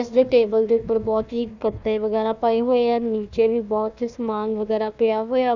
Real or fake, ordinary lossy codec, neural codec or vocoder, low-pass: fake; none; codec, 16 kHz, 1 kbps, FunCodec, trained on Chinese and English, 50 frames a second; 7.2 kHz